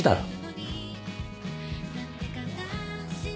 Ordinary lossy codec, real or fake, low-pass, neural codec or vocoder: none; real; none; none